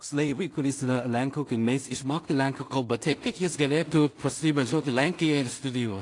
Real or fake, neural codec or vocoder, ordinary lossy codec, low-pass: fake; codec, 16 kHz in and 24 kHz out, 0.4 kbps, LongCat-Audio-Codec, two codebook decoder; AAC, 48 kbps; 10.8 kHz